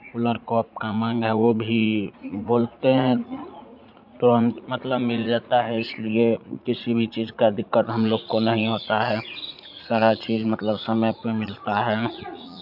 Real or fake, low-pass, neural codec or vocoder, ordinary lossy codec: fake; 5.4 kHz; vocoder, 44.1 kHz, 80 mel bands, Vocos; AAC, 48 kbps